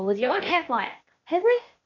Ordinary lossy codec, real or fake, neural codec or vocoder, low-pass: none; fake; codec, 16 kHz, 0.8 kbps, ZipCodec; 7.2 kHz